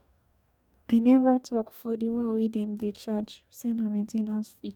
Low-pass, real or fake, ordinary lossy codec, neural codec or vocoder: 19.8 kHz; fake; none; codec, 44.1 kHz, 2.6 kbps, DAC